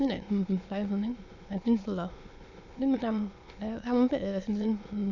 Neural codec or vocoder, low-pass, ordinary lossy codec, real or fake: autoencoder, 22.05 kHz, a latent of 192 numbers a frame, VITS, trained on many speakers; 7.2 kHz; AAC, 48 kbps; fake